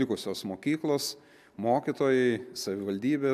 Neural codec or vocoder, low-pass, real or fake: none; 14.4 kHz; real